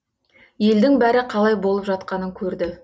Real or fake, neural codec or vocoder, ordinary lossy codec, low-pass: real; none; none; none